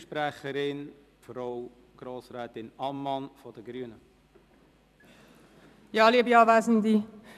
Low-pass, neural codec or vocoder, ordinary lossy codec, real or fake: 14.4 kHz; vocoder, 44.1 kHz, 128 mel bands every 256 samples, BigVGAN v2; none; fake